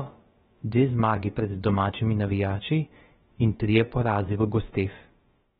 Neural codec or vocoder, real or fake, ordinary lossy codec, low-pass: codec, 16 kHz, about 1 kbps, DyCAST, with the encoder's durations; fake; AAC, 16 kbps; 7.2 kHz